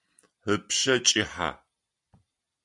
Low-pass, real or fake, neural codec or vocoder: 10.8 kHz; real; none